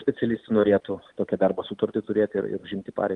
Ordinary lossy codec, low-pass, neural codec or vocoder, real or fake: Opus, 32 kbps; 10.8 kHz; none; real